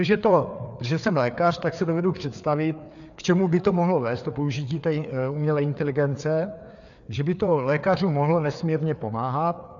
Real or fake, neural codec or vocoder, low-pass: fake; codec, 16 kHz, 4 kbps, FreqCodec, larger model; 7.2 kHz